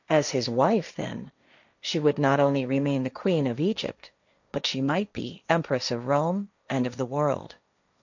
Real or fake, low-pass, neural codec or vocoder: fake; 7.2 kHz; codec, 16 kHz, 1.1 kbps, Voila-Tokenizer